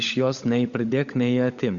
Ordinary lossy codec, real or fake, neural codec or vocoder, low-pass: Opus, 64 kbps; fake; codec, 16 kHz, 16 kbps, FunCodec, trained on LibriTTS, 50 frames a second; 7.2 kHz